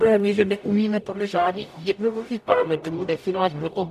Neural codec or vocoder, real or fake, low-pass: codec, 44.1 kHz, 0.9 kbps, DAC; fake; 14.4 kHz